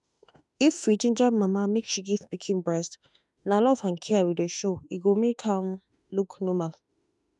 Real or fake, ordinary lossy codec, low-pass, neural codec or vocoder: fake; none; 10.8 kHz; autoencoder, 48 kHz, 32 numbers a frame, DAC-VAE, trained on Japanese speech